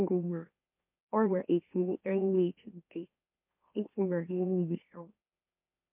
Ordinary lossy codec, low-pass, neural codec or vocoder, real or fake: MP3, 32 kbps; 3.6 kHz; autoencoder, 44.1 kHz, a latent of 192 numbers a frame, MeloTTS; fake